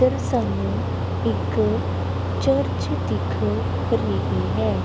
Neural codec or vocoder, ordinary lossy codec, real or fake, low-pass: none; none; real; none